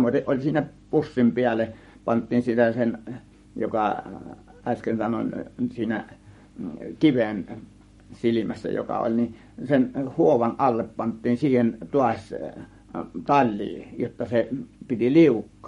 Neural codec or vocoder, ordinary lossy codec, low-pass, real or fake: codec, 44.1 kHz, 7.8 kbps, Pupu-Codec; MP3, 48 kbps; 19.8 kHz; fake